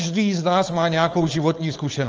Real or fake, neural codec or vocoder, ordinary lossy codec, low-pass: fake; codec, 16 kHz, 4.8 kbps, FACodec; Opus, 24 kbps; 7.2 kHz